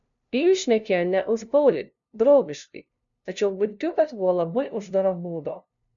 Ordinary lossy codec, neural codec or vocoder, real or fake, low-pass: MP3, 96 kbps; codec, 16 kHz, 0.5 kbps, FunCodec, trained on LibriTTS, 25 frames a second; fake; 7.2 kHz